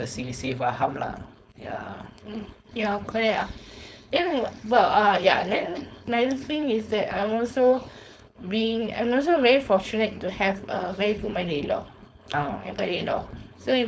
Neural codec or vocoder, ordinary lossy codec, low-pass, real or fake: codec, 16 kHz, 4.8 kbps, FACodec; none; none; fake